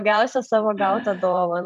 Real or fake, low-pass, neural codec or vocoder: fake; 14.4 kHz; vocoder, 44.1 kHz, 128 mel bands every 512 samples, BigVGAN v2